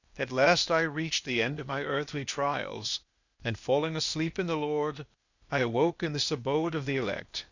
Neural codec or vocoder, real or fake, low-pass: codec, 16 kHz, 0.8 kbps, ZipCodec; fake; 7.2 kHz